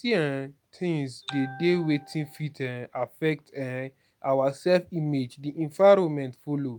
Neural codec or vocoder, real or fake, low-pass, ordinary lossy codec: none; real; none; none